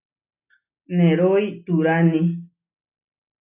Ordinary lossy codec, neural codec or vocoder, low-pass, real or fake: AAC, 32 kbps; none; 3.6 kHz; real